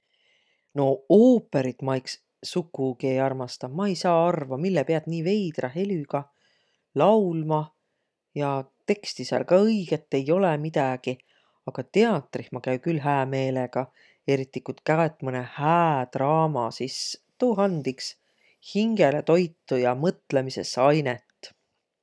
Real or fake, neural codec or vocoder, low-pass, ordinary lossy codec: real; none; none; none